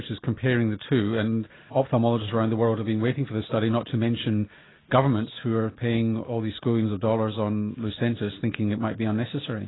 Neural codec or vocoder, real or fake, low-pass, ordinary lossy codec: none; real; 7.2 kHz; AAC, 16 kbps